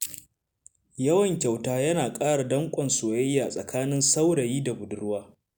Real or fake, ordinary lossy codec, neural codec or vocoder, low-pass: real; none; none; none